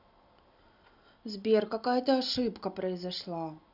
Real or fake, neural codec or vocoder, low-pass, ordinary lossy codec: real; none; 5.4 kHz; none